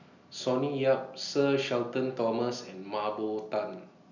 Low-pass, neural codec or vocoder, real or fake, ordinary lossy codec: 7.2 kHz; none; real; none